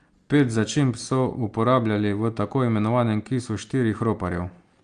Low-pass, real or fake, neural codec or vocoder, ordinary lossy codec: 9.9 kHz; real; none; Opus, 32 kbps